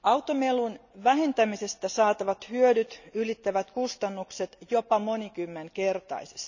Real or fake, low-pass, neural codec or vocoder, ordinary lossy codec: real; 7.2 kHz; none; none